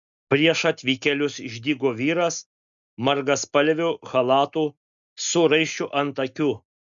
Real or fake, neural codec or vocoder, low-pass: real; none; 7.2 kHz